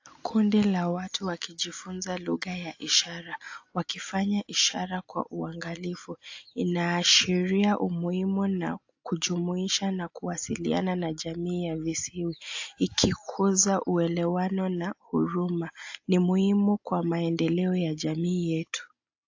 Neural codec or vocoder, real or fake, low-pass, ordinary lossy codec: none; real; 7.2 kHz; AAC, 48 kbps